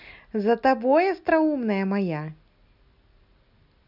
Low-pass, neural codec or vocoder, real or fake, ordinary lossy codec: 5.4 kHz; none; real; none